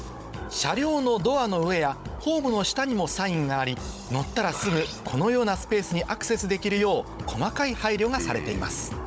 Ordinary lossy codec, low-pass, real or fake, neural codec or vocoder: none; none; fake; codec, 16 kHz, 16 kbps, FunCodec, trained on Chinese and English, 50 frames a second